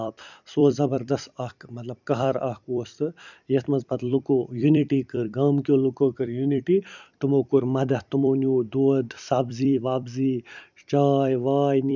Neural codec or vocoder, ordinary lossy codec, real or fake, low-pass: vocoder, 44.1 kHz, 128 mel bands every 256 samples, BigVGAN v2; none; fake; 7.2 kHz